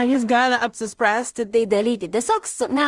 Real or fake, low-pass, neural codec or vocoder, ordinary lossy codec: fake; 10.8 kHz; codec, 16 kHz in and 24 kHz out, 0.4 kbps, LongCat-Audio-Codec, two codebook decoder; Opus, 24 kbps